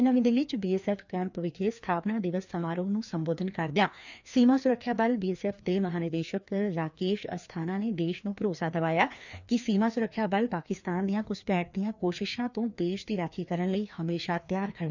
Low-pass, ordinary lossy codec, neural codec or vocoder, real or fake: 7.2 kHz; none; codec, 16 kHz, 2 kbps, FreqCodec, larger model; fake